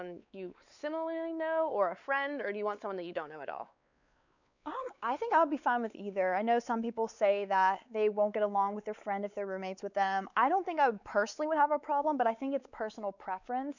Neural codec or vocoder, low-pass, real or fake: codec, 16 kHz, 4 kbps, X-Codec, WavLM features, trained on Multilingual LibriSpeech; 7.2 kHz; fake